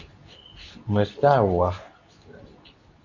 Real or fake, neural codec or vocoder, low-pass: fake; codec, 24 kHz, 0.9 kbps, WavTokenizer, medium speech release version 2; 7.2 kHz